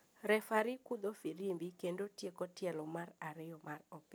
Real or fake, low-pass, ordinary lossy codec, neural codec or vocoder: real; none; none; none